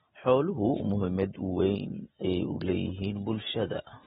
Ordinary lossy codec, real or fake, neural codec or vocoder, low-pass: AAC, 16 kbps; real; none; 19.8 kHz